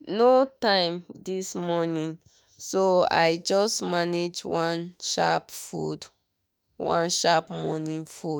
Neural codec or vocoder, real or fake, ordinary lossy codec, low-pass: autoencoder, 48 kHz, 32 numbers a frame, DAC-VAE, trained on Japanese speech; fake; none; none